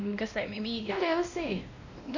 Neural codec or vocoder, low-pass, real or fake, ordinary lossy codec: codec, 16 kHz, 1 kbps, X-Codec, WavLM features, trained on Multilingual LibriSpeech; 7.2 kHz; fake; none